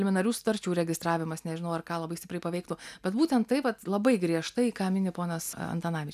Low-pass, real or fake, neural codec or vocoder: 14.4 kHz; real; none